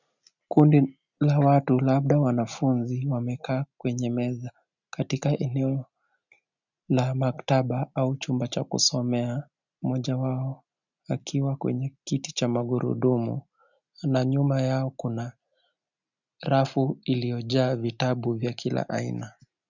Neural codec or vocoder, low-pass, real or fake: none; 7.2 kHz; real